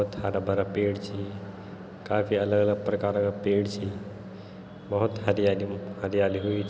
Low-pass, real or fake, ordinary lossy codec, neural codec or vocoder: none; real; none; none